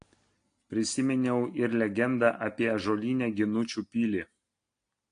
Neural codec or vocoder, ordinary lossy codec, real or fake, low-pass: none; AAC, 48 kbps; real; 9.9 kHz